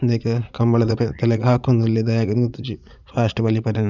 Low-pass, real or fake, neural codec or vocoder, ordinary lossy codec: 7.2 kHz; fake; vocoder, 44.1 kHz, 80 mel bands, Vocos; none